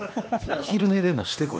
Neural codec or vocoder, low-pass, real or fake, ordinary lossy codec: codec, 16 kHz, 2 kbps, X-Codec, HuBERT features, trained on LibriSpeech; none; fake; none